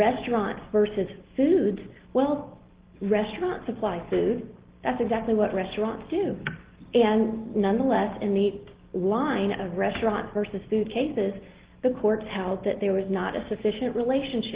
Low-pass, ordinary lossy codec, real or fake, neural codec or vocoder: 3.6 kHz; Opus, 16 kbps; real; none